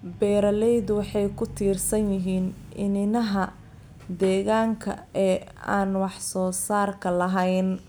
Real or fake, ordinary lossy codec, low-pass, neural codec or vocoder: real; none; none; none